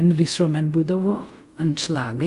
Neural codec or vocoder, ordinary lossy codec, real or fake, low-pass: codec, 24 kHz, 0.5 kbps, DualCodec; Opus, 64 kbps; fake; 10.8 kHz